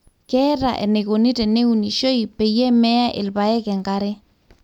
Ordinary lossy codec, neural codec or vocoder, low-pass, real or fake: none; none; 19.8 kHz; real